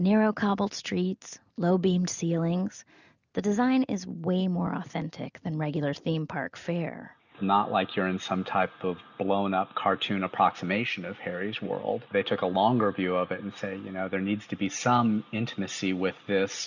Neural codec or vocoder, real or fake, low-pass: none; real; 7.2 kHz